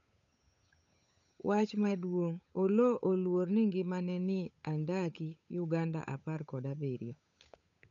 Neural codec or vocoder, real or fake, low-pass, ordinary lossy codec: codec, 16 kHz, 8 kbps, FunCodec, trained on Chinese and English, 25 frames a second; fake; 7.2 kHz; none